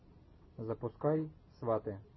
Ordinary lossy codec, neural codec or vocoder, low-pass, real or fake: MP3, 24 kbps; none; 7.2 kHz; real